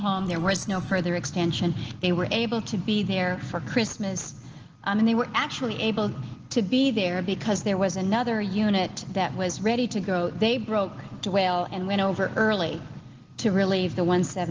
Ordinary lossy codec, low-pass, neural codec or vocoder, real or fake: Opus, 16 kbps; 7.2 kHz; none; real